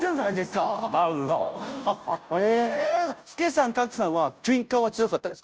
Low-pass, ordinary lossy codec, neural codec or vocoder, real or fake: none; none; codec, 16 kHz, 0.5 kbps, FunCodec, trained on Chinese and English, 25 frames a second; fake